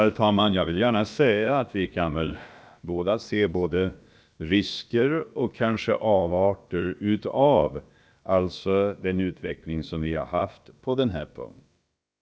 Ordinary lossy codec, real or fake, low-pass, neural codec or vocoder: none; fake; none; codec, 16 kHz, about 1 kbps, DyCAST, with the encoder's durations